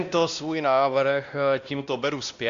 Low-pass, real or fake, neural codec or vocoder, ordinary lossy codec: 7.2 kHz; fake; codec, 16 kHz, 1 kbps, X-Codec, WavLM features, trained on Multilingual LibriSpeech; Opus, 64 kbps